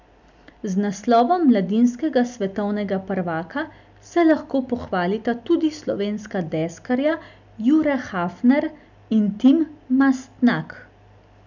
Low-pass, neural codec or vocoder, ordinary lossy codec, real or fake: 7.2 kHz; none; none; real